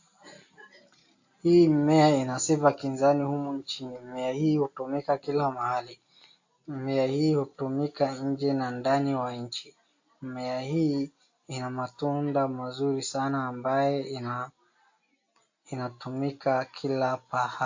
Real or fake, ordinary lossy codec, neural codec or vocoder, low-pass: real; AAC, 48 kbps; none; 7.2 kHz